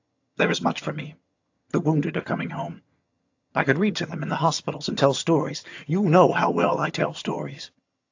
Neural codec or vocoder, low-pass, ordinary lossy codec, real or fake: vocoder, 22.05 kHz, 80 mel bands, HiFi-GAN; 7.2 kHz; AAC, 48 kbps; fake